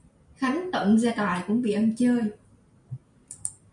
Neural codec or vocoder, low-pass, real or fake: none; 10.8 kHz; real